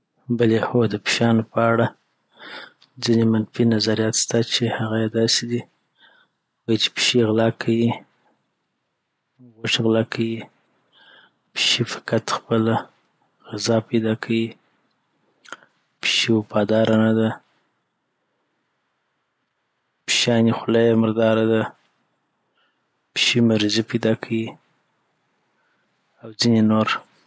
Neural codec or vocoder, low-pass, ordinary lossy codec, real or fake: none; none; none; real